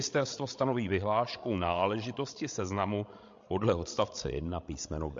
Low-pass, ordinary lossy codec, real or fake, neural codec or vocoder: 7.2 kHz; MP3, 48 kbps; fake; codec, 16 kHz, 16 kbps, FreqCodec, larger model